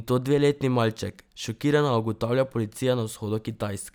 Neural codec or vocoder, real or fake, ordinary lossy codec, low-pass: none; real; none; none